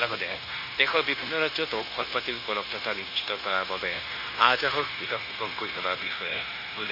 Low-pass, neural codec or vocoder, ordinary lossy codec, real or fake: 5.4 kHz; codec, 16 kHz, 0.9 kbps, LongCat-Audio-Codec; MP3, 24 kbps; fake